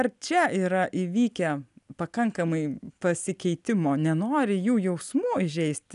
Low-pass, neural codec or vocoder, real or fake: 10.8 kHz; none; real